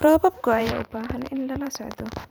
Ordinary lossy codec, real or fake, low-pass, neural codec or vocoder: none; fake; none; vocoder, 44.1 kHz, 128 mel bands every 512 samples, BigVGAN v2